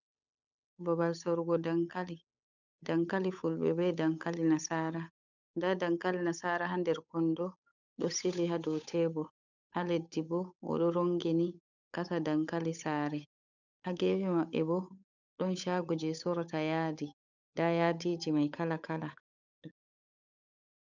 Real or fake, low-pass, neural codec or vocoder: fake; 7.2 kHz; codec, 16 kHz, 8 kbps, FunCodec, trained on Chinese and English, 25 frames a second